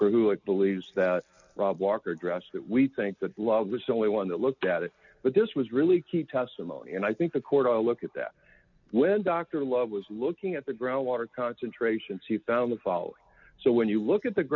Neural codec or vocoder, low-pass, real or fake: none; 7.2 kHz; real